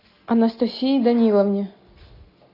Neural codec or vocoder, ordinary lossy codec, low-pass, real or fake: none; AAC, 32 kbps; 5.4 kHz; real